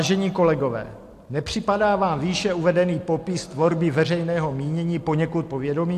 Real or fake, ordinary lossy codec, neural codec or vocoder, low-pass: real; AAC, 64 kbps; none; 14.4 kHz